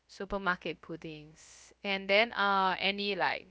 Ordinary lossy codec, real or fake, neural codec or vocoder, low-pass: none; fake; codec, 16 kHz, about 1 kbps, DyCAST, with the encoder's durations; none